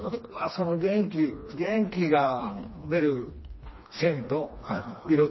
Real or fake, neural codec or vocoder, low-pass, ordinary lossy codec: fake; codec, 16 kHz, 2 kbps, FreqCodec, smaller model; 7.2 kHz; MP3, 24 kbps